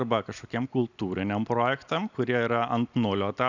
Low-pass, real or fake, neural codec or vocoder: 7.2 kHz; real; none